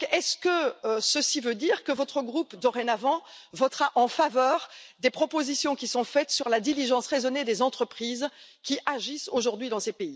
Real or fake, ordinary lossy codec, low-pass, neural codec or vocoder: real; none; none; none